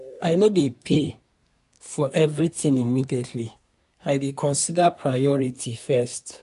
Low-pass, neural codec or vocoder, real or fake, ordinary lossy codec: 10.8 kHz; codec, 24 kHz, 1 kbps, SNAC; fake; none